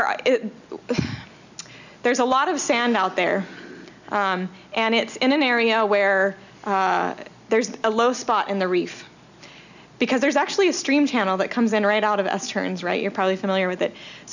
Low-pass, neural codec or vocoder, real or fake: 7.2 kHz; none; real